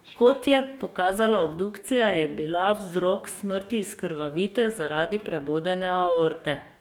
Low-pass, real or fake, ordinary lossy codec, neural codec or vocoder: 19.8 kHz; fake; none; codec, 44.1 kHz, 2.6 kbps, DAC